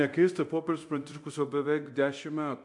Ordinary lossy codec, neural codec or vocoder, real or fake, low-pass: AAC, 64 kbps; codec, 24 kHz, 0.9 kbps, DualCodec; fake; 10.8 kHz